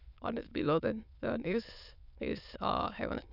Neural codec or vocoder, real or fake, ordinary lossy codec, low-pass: autoencoder, 22.05 kHz, a latent of 192 numbers a frame, VITS, trained on many speakers; fake; none; 5.4 kHz